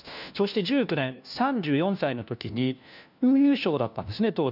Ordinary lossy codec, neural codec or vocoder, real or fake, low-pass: none; codec, 16 kHz, 1 kbps, FunCodec, trained on LibriTTS, 50 frames a second; fake; 5.4 kHz